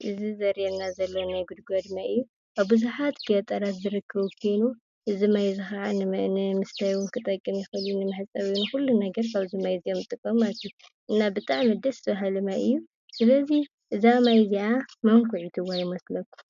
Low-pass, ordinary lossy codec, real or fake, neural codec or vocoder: 7.2 kHz; MP3, 64 kbps; real; none